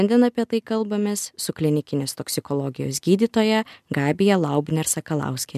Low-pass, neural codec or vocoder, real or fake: 14.4 kHz; none; real